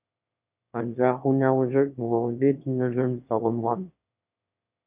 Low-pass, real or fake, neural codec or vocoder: 3.6 kHz; fake; autoencoder, 22.05 kHz, a latent of 192 numbers a frame, VITS, trained on one speaker